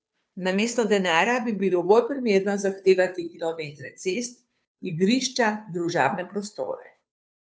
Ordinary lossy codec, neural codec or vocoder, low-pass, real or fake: none; codec, 16 kHz, 2 kbps, FunCodec, trained on Chinese and English, 25 frames a second; none; fake